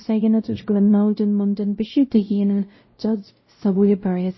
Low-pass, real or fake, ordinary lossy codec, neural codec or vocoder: 7.2 kHz; fake; MP3, 24 kbps; codec, 16 kHz, 0.5 kbps, X-Codec, WavLM features, trained on Multilingual LibriSpeech